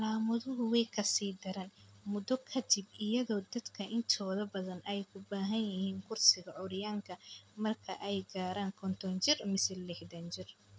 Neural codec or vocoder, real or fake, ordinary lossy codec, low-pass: none; real; none; none